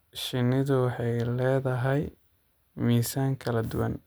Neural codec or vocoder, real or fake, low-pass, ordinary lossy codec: none; real; none; none